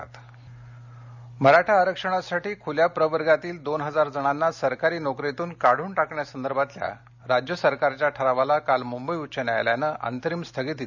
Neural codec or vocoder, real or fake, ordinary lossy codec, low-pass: none; real; none; 7.2 kHz